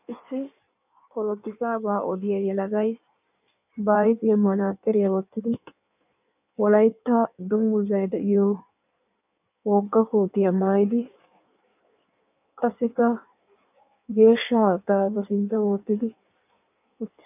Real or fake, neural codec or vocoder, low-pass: fake; codec, 16 kHz in and 24 kHz out, 1.1 kbps, FireRedTTS-2 codec; 3.6 kHz